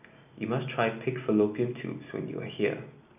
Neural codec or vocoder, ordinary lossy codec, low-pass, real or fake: none; none; 3.6 kHz; real